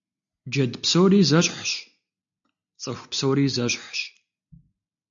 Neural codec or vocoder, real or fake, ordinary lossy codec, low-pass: none; real; AAC, 64 kbps; 7.2 kHz